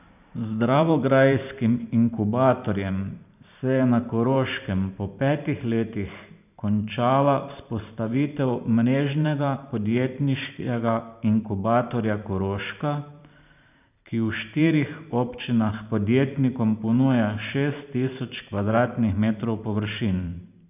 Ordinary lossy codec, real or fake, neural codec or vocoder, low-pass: none; fake; vocoder, 44.1 kHz, 128 mel bands every 512 samples, BigVGAN v2; 3.6 kHz